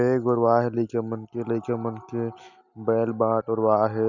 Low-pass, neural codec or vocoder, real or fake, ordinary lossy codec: 7.2 kHz; none; real; none